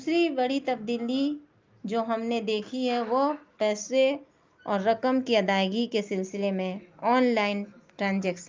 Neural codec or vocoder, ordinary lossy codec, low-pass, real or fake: none; Opus, 24 kbps; 7.2 kHz; real